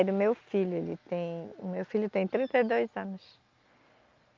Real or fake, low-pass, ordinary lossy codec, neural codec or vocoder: real; 7.2 kHz; Opus, 24 kbps; none